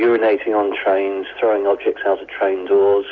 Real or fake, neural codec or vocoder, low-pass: real; none; 7.2 kHz